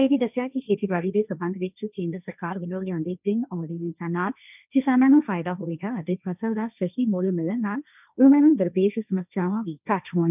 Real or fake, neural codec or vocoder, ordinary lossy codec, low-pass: fake; codec, 16 kHz, 1.1 kbps, Voila-Tokenizer; none; 3.6 kHz